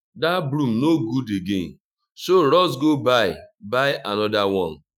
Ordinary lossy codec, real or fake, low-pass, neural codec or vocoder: none; fake; 19.8 kHz; autoencoder, 48 kHz, 128 numbers a frame, DAC-VAE, trained on Japanese speech